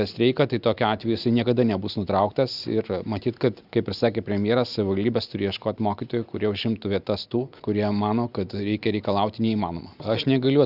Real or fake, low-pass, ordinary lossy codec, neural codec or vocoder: real; 5.4 kHz; Opus, 64 kbps; none